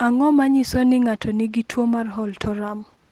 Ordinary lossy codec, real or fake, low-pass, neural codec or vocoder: Opus, 16 kbps; real; 19.8 kHz; none